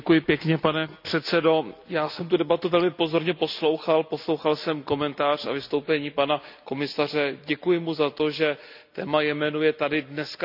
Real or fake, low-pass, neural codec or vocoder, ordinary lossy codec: real; 5.4 kHz; none; none